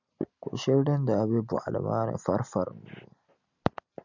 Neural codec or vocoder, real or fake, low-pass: none; real; 7.2 kHz